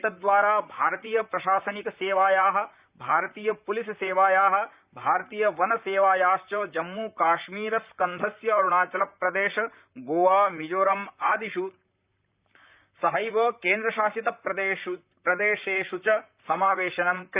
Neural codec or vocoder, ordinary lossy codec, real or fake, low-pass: vocoder, 44.1 kHz, 128 mel bands, Pupu-Vocoder; Opus, 64 kbps; fake; 3.6 kHz